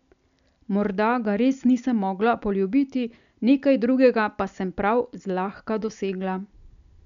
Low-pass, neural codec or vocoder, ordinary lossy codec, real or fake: 7.2 kHz; none; none; real